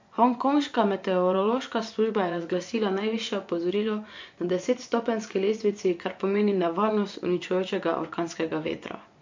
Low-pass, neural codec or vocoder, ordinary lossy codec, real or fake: 7.2 kHz; vocoder, 24 kHz, 100 mel bands, Vocos; MP3, 48 kbps; fake